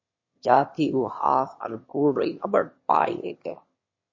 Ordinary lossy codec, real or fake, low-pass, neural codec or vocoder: MP3, 32 kbps; fake; 7.2 kHz; autoencoder, 22.05 kHz, a latent of 192 numbers a frame, VITS, trained on one speaker